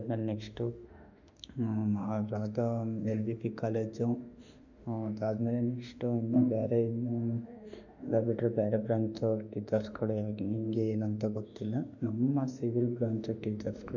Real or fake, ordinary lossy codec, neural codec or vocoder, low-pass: fake; none; autoencoder, 48 kHz, 32 numbers a frame, DAC-VAE, trained on Japanese speech; 7.2 kHz